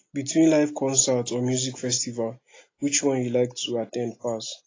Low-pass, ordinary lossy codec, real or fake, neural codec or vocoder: 7.2 kHz; AAC, 32 kbps; real; none